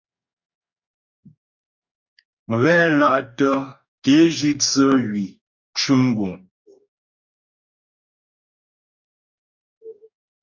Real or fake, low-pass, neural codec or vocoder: fake; 7.2 kHz; codec, 44.1 kHz, 2.6 kbps, DAC